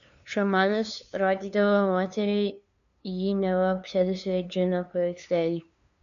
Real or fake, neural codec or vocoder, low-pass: fake; codec, 16 kHz, 2 kbps, FunCodec, trained on LibriTTS, 25 frames a second; 7.2 kHz